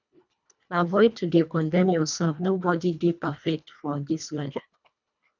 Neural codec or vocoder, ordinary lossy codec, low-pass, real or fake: codec, 24 kHz, 1.5 kbps, HILCodec; none; 7.2 kHz; fake